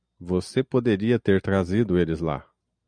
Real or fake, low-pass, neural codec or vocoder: real; 9.9 kHz; none